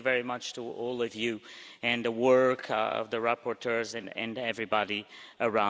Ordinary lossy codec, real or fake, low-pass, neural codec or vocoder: none; real; none; none